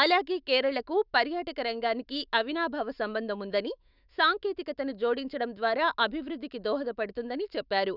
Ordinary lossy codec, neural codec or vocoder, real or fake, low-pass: none; autoencoder, 48 kHz, 128 numbers a frame, DAC-VAE, trained on Japanese speech; fake; 5.4 kHz